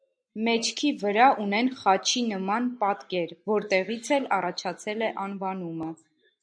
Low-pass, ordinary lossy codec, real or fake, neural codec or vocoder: 9.9 kHz; MP3, 96 kbps; real; none